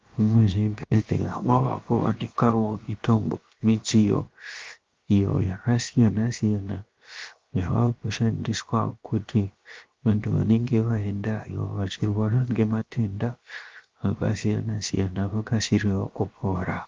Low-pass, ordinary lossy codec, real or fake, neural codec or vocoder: 7.2 kHz; Opus, 32 kbps; fake; codec, 16 kHz, 0.7 kbps, FocalCodec